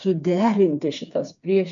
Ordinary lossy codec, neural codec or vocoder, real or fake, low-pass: MP3, 64 kbps; codec, 16 kHz, 4 kbps, FreqCodec, smaller model; fake; 7.2 kHz